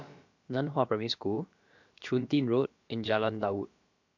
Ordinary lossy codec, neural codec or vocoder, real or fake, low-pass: MP3, 48 kbps; codec, 16 kHz, about 1 kbps, DyCAST, with the encoder's durations; fake; 7.2 kHz